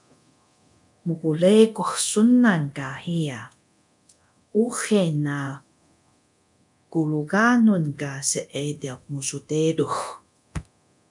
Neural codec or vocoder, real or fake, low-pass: codec, 24 kHz, 0.9 kbps, DualCodec; fake; 10.8 kHz